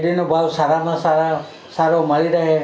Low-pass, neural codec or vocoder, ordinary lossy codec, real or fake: none; none; none; real